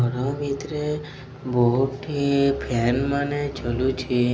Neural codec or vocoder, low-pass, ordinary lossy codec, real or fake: none; none; none; real